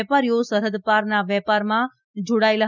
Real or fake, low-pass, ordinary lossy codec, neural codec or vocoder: real; 7.2 kHz; none; none